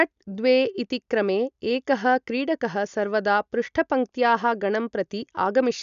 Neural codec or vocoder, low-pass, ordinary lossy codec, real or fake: none; 7.2 kHz; none; real